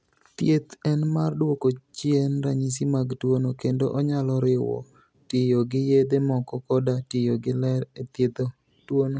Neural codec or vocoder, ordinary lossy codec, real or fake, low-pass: none; none; real; none